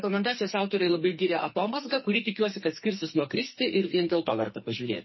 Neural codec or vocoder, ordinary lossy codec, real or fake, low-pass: codec, 44.1 kHz, 2.6 kbps, SNAC; MP3, 24 kbps; fake; 7.2 kHz